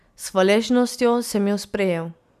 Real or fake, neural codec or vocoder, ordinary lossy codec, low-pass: real; none; none; 14.4 kHz